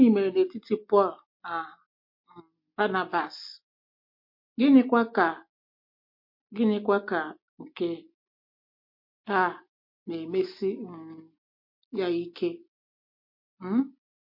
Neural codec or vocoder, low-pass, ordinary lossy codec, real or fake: none; 5.4 kHz; MP3, 32 kbps; real